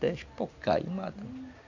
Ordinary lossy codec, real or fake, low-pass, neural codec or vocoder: none; real; 7.2 kHz; none